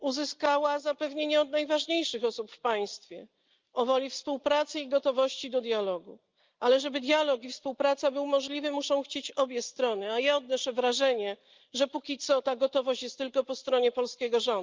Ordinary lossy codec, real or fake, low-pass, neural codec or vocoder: Opus, 24 kbps; real; 7.2 kHz; none